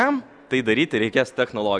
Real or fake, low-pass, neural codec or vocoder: real; 9.9 kHz; none